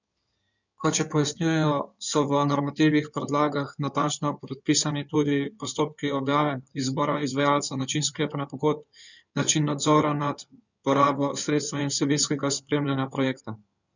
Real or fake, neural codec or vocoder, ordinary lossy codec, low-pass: fake; codec, 16 kHz in and 24 kHz out, 2.2 kbps, FireRedTTS-2 codec; none; 7.2 kHz